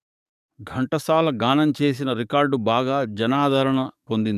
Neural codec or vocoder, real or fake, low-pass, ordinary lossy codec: codec, 44.1 kHz, 7.8 kbps, DAC; fake; 14.4 kHz; none